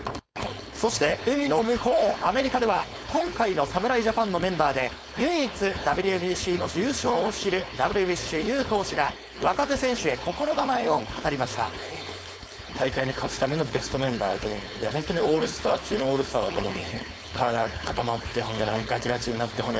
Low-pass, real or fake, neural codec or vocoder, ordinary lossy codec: none; fake; codec, 16 kHz, 4.8 kbps, FACodec; none